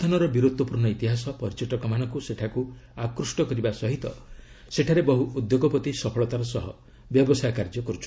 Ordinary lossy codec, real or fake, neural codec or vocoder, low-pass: none; real; none; none